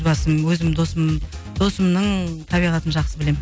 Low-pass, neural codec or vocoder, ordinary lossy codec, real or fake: none; none; none; real